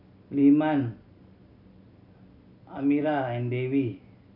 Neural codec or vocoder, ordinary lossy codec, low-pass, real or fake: none; none; 5.4 kHz; real